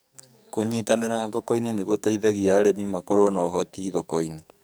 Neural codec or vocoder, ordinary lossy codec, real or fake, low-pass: codec, 44.1 kHz, 2.6 kbps, SNAC; none; fake; none